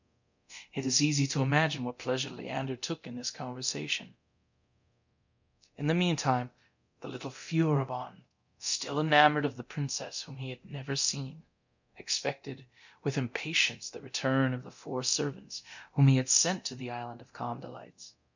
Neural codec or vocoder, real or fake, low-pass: codec, 24 kHz, 0.9 kbps, DualCodec; fake; 7.2 kHz